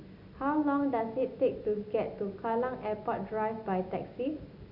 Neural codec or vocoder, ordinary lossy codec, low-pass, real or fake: none; none; 5.4 kHz; real